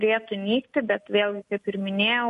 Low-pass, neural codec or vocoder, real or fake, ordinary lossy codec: 14.4 kHz; none; real; MP3, 48 kbps